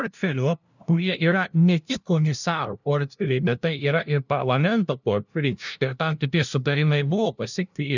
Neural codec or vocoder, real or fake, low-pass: codec, 16 kHz, 0.5 kbps, FunCodec, trained on LibriTTS, 25 frames a second; fake; 7.2 kHz